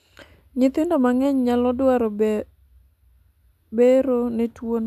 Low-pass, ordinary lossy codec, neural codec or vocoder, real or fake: 14.4 kHz; none; none; real